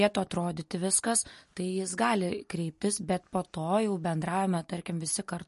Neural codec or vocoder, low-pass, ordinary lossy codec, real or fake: none; 14.4 kHz; MP3, 48 kbps; real